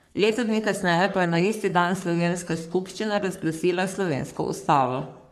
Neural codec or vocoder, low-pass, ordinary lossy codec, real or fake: codec, 44.1 kHz, 3.4 kbps, Pupu-Codec; 14.4 kHz; none; fake